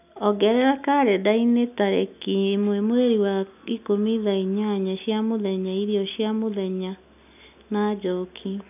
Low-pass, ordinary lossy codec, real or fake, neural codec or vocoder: 3.6 kHz; none; real; none